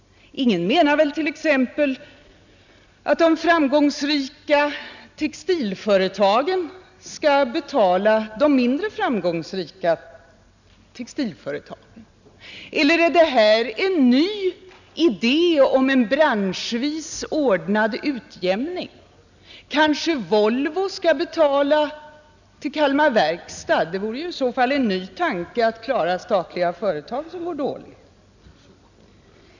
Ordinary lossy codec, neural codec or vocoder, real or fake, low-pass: none; none; real; 7.2 kHz